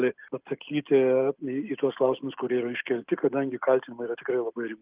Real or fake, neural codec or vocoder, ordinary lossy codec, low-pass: real; none; Opus, 32 kbps; 3.6 kHz